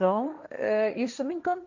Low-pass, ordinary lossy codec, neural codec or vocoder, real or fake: 7.2 kHz; none; codec, 16 kHz, 1.1 kbps, Voila-Tokenizer; fake